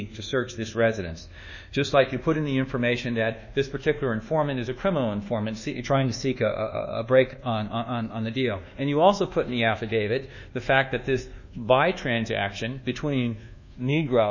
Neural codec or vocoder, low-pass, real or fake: codec, 24 kHz, 1.2 kbps, DualCodec; 7.2 kHz; fake